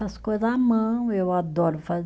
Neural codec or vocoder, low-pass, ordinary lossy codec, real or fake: none; none; none; real